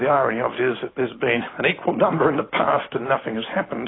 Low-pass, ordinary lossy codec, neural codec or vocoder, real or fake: 7.2 kHz; AAC, 16 kbps; none; real